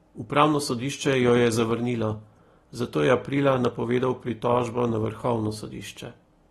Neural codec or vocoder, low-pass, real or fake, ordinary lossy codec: none; 14.4 kHz; real; AAC, 32 kbps